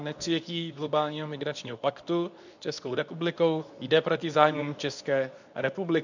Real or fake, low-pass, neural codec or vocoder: fake; 7.2 kHz; codec, 24 kHz, 0.9 kbps, WavTokenizer, medium speech release version 2